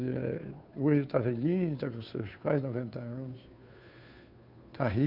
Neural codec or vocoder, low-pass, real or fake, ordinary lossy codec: codec, 16 kHz, 2 kbps, FunCodec, trained on Chinese and English, 25 frames a second; 5.4 kHz; fake; none